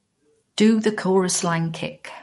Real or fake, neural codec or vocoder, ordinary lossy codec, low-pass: fake; codec, 44.1 kHz, 7.8 kbps, DAC; MP3, 48 kbps; 19.8 kHz